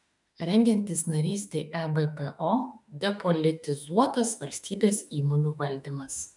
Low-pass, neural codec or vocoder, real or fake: 10.8 kHz; autoencoder, 48 kHz, 32 numbers a frame, DAC-VAE, trained on Japanese speech; fake